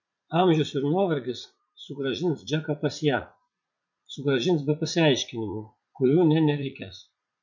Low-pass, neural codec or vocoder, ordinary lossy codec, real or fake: 7.2 kHz; vocoder, 44.1 kHz, 80 mel bands, Vocos; MP3, 48 kbps; fake